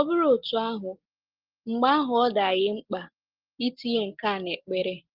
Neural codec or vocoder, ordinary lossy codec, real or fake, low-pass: none; Opus, 16 kbps; real; 5.4 kHz